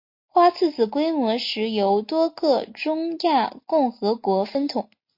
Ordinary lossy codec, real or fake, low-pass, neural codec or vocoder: MP3, 32 kbps; real; 5.4 kHz; none